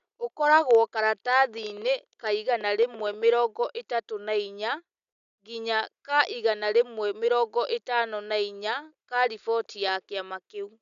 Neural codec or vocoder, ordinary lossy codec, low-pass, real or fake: none; none; 7.2 kHz; real